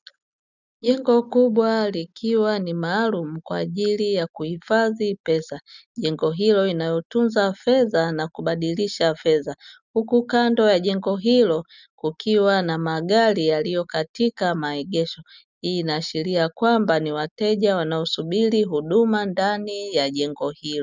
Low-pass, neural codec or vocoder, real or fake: 7.2 kHz; none; real